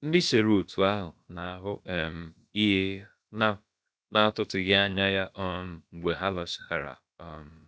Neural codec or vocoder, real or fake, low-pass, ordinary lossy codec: codec, 16 kHz, about 1 kbps, DyCAST, with the encoder's durations; fake; none; none